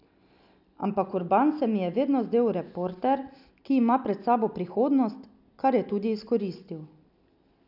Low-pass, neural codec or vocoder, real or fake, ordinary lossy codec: 5.4 kHz; none; real; none